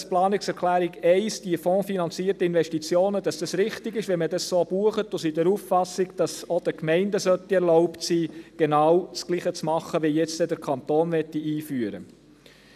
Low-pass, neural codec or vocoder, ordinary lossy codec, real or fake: 14.4 kHz; none; none; real